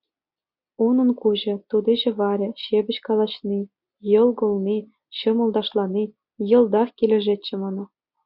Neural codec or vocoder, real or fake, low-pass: none; real; 5.4 kHz